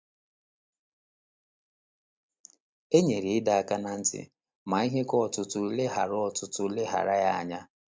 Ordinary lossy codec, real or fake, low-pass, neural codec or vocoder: none; real; none; none